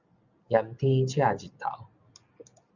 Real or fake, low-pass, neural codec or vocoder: real; 7.2 kHz; none